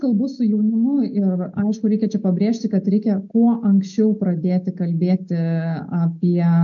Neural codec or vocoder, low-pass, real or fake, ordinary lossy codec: none; 7.2 kHz; real; AAC, 64 kbps